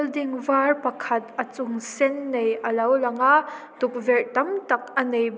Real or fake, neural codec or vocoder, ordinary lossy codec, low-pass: real; none; none; none